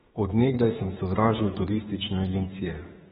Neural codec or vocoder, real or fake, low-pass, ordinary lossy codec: autoencoder, 48 kHz, 32 numbers a frame, DAC-VAE, trained on Japanese speech; fake; 19.8 kHz; AAC, 16 kbps